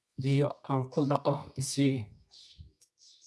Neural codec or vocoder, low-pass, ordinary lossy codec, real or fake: codec, 24 kHz, 0.9 kbps, WavTokenizer, medium music audio release; none; none; fake